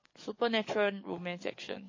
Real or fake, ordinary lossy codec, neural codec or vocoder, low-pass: fake; MP3, 32 kbps; codec, 44.1 kHz, 7.8 kbps, DAC; 7.2 kHz